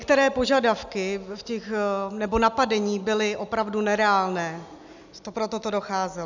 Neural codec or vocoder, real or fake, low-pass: none; real; 7.2 kHz